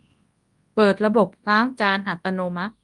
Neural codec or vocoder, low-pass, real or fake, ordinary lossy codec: codec, 24 kHz, 0.9 kbps, WavTokenizer, large speech release; 10.8 kHz; fake; Opus, 32 kbps